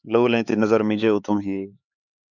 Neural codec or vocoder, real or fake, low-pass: codec, 16 kHz, 4 kbps, X-Codec, HuBERT features, trained on LibriSpeech; fake; 7.2 kHz